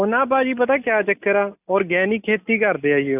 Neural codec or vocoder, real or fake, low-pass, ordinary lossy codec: none; real; 3.6 kHz; AAC, 32 kbps